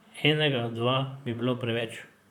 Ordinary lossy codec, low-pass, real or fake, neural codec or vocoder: none; 19.8 kHz; fake; vocoder, 44.1 kHz, 128 mel bands, Pupu-Vocoder